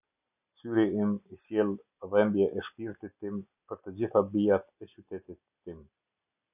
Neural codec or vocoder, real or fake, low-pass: none; real; 3.6 kHz